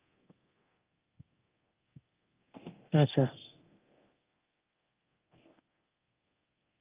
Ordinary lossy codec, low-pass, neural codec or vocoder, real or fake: Opus, 32 kbps; 3.6 kHz; codec, 24 kHz, 0.9 kbps, DualCodec; fake